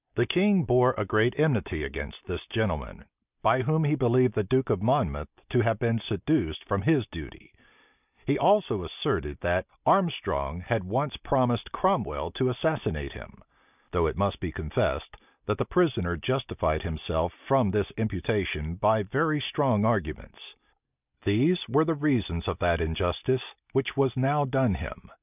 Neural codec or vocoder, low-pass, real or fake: none; 3.6 kHz; real